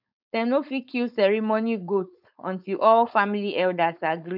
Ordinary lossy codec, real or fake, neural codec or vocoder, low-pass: none; fake; codec, 16 kHz, 4.8 kbps, FACodec; 5.4 kHz